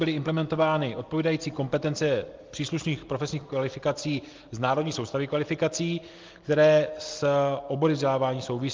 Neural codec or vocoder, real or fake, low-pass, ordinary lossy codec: none; real; 7.2 kHz; Opus, 32 kbps